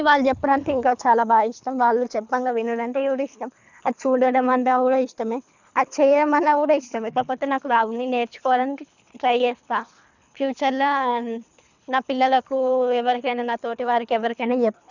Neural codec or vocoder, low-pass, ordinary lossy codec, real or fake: codec, 24 kHz, 3 kbps, HILCodec; 7.2 kHz; none; fake